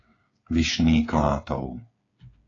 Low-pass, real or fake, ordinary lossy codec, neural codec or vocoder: 7.2 kHz; fake; AAC, 32 kbps; codec, 16 kHz, 8 kbps, FreqCodec, smaller model